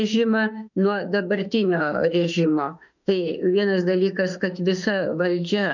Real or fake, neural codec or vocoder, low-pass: fake; autoencoder, 48 kHz, 32 numbers a frame, DAC-VAE, trained on Japanese speech; 7.2 kHz